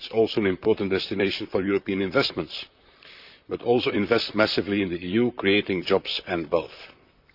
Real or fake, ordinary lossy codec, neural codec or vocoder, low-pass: fake; none; vocoder, 44.1 kHz, 128 mel bands, Pupu-Vocoder; 5.4 kHz